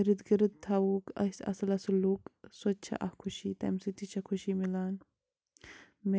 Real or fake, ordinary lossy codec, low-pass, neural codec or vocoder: real; none; none; none